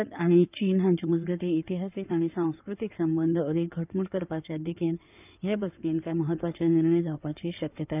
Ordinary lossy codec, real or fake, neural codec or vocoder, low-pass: none; fake; codec, 16 kHz in and 24 kHz out, 2.2 kbps, FireRedTTS-2 codec; 3.6 kHz